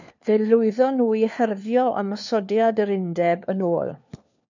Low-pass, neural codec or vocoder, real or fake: 7.2 kHz; codec, 16 kHz, 4 kbps, FunCodec, trained on LibriTTS, 50 frames a second; fake